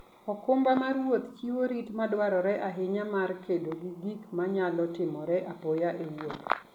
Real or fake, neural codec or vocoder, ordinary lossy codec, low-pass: real; none; none; 19.8 kHz